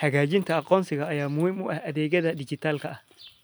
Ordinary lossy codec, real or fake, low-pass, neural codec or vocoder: none; real; none; none